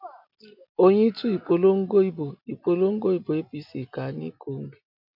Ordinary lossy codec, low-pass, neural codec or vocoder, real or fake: MP3, 48 kbps; 5.4 kHz; none; real